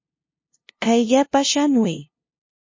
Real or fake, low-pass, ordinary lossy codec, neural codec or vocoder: fake; 7.2 kHz; MP3, 32 kbps; codec, 16 kHz, 0.5 kbps, FunCodec, trained on LibriTTS, 25 frames a second